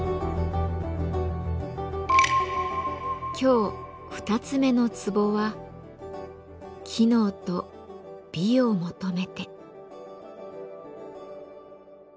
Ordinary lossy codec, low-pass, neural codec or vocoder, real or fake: none; none; none; real